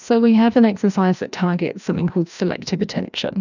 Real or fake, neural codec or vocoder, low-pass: fake; codec, 16 kHz, 1 kbps, FreqCodec, larger model; 7.2 kHz